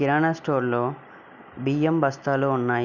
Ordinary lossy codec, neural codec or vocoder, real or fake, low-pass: none; none; real; 7.2 kHz